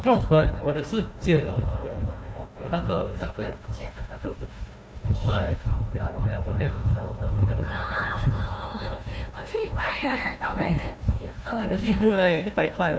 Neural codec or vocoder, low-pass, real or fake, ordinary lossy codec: codec, 16 kHz, 1 kbps, FunCodec, trained on Chinese and English, 50 frames a second; none; fake; none